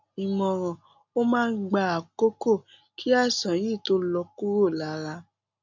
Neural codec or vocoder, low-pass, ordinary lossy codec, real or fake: none; 7.2 kHz; none; real